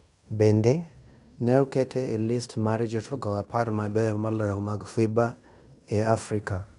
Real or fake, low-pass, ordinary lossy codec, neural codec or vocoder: fake; 10.8 kHz; none; codec, 16 kHz in and 24 kHz out, 0.9 kbps, LongCat-Audio-Codec, fine tuned four codebook decoder